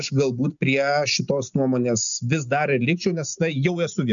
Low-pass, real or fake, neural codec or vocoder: 7.2 kHz; real; none